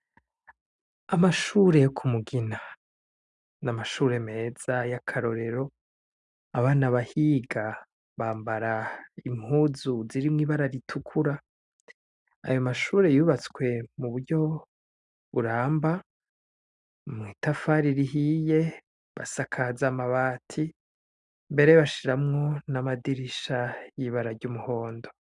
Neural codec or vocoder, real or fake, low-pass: none; real; 10.8 kHz